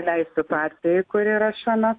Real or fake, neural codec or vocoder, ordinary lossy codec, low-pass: real; none; AAC, 48 kbps; 9.9 kHz